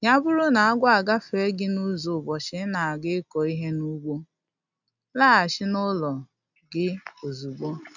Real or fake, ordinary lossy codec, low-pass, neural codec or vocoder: real; none; 7.2 kHz; none